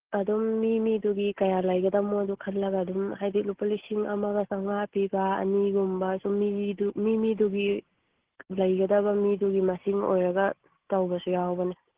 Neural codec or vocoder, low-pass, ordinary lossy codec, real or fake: none; 3.6 kHz; Opus, 16 kbps; real